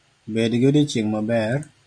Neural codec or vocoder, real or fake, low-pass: none; real; 9.9 kHz